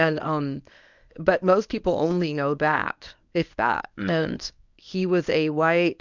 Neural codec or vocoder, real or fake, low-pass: codec, 24 kHz, 0.9 kbps, WavTokenizer, medium speech release version 1; fake; 7.2 kHz